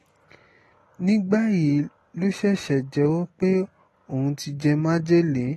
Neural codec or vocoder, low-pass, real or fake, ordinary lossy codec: none; 19.8 kHz; real; AAC, 32 kbps